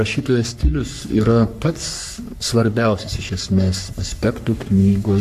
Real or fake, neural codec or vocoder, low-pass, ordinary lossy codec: fake; codec, 44.1 kHz, 3.4 kbps, Pupu-Codec; 14.4 kHz; AAC, 96 kbps